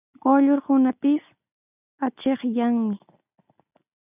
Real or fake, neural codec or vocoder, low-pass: real; none; 3.6 kHz